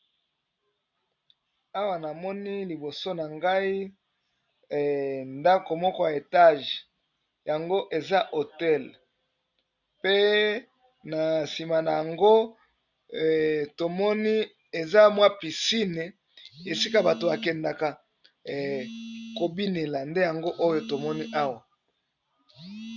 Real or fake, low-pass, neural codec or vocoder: real; 7.2 kHz; none